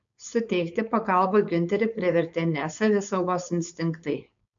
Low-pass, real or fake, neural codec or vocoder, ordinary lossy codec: 7.2 kHz; fake; codec, 16 kHz, 4.8 kbps, FACodec; AAC, 64 kbps